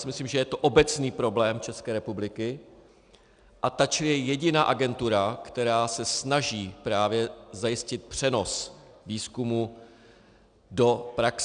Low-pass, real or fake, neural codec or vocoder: 9.9 kHz; real; none